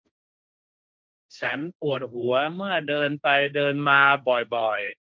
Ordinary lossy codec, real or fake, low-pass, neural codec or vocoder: none; fake; none; codec, 16 kHz, 1.1 kbps, Voila-Tokenizer